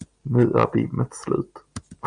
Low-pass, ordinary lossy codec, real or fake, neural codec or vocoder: 9.9 kHz; Opus, 64 kbps; real; none